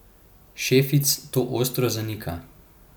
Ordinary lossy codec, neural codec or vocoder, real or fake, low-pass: none; none; real; none